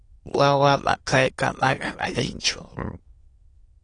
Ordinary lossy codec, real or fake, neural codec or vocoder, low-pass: AAC, 32 kbps; fake; autoencoder, 22.05 kHz, a latent of 192 numbers a frame, VITS, trained on many speakers; 9.9 kHz